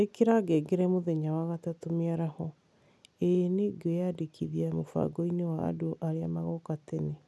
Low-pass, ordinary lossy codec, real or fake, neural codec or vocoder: none; none; real; none